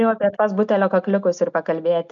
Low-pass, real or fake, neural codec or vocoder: 7.2 kHz; real; none